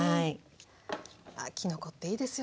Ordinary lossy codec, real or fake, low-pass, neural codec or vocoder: none; real; none; none